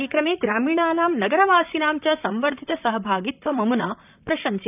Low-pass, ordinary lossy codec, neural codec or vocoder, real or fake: 3.6 kHz; none; vocoder, 44.1 kHz, 128 mel bands, Pupu-Vocoder; fake